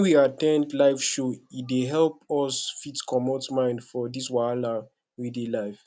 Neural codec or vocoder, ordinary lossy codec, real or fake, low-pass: none; none; real; none